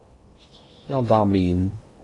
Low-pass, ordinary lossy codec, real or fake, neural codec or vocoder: 10.8 kHz; AAC, 32 kbps; fake; codec, 16 kHz in and 24 kHz out, 0.8 kbps, FocalCodec, streaming, 65536 codes